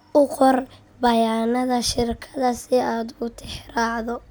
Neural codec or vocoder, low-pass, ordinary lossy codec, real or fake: none; none; none; real